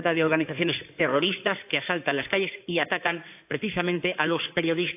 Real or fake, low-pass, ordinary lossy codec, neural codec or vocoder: fake; 3.6 kHz; AAC, 32 kbps; codec, 16 kHz in and 24 kHz out, 2.2 kbps, FireRedTTS-2 codec